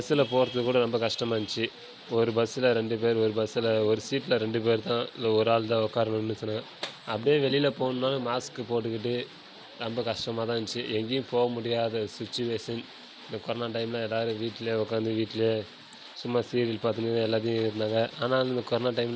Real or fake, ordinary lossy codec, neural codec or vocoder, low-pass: real; none; none; none